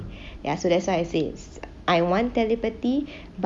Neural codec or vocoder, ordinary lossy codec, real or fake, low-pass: none; none; real; none